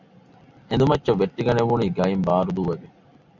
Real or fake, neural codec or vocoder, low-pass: real; none; 7.2 kHz